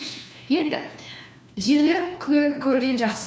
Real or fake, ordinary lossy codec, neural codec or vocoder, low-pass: fake; none; codec, 16 kHz, 1 kbps, FunCodec, trained on LibriTTS, 50 frames a second; none